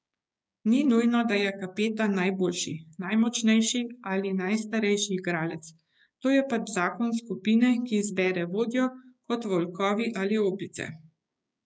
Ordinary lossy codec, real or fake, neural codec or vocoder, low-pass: none; fake; codec, 16 kHz, 6 kbps, DAC; none